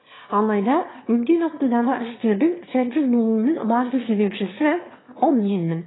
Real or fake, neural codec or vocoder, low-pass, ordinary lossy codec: fake; autoencoder, 22.05 kHz, a latent of 192 numbers a frame, VITS, trained on one speaker; 7.2 kHz; AAC, 16 kbps